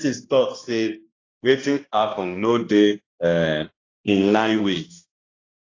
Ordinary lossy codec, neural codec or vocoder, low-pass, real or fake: AAC, 32 kbps; codec, 16 kHz, 1 kbps, X-Codec, HuBERT features, trained on balanced general audio; 7.2 kHz; fake